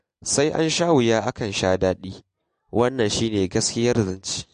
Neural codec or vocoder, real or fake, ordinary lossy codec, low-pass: none; real; MP3, 48 kbps; 9.9 kHz